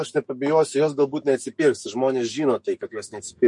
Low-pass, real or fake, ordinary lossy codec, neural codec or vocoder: 10.8 kHz; fake; MP3, 48 kbps; autoencoder, 48 kHz, 128 numbers a frame, DAC-VAE, trained on Japanese speech